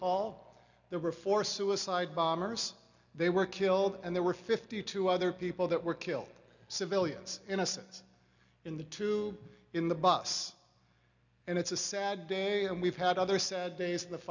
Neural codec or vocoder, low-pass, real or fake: none; 7.2 kHz; real